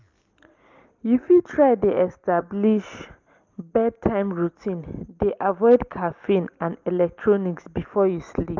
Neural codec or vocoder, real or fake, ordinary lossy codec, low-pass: none; real; Opus, 32 kbps; 7.2 kHz